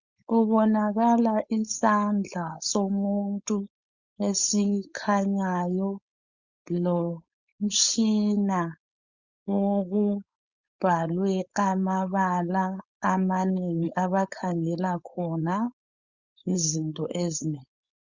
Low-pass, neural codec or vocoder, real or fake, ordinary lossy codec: 7.2 kHz; codec, 16 kHz, 4.8 kbps, FACodec; fake; Opus, 64 kbps